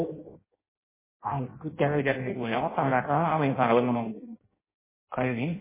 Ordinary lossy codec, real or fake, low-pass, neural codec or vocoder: MP3, 16 kbps; fake; 3.6 kHz; codec, 16 kHz in and 24 kHz out, 0.6 kbps, FireRedTTS-2 codec